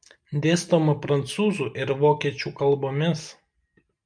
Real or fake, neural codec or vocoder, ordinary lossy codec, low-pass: real; none; MP3, 96 kbps; 9.9 kHz